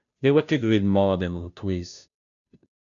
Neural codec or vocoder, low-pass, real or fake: codec, 16 kHz, 0.5 kbps, FunCodec, trained on Chinese and English, 25 frames a second; 7.2 kHz; fake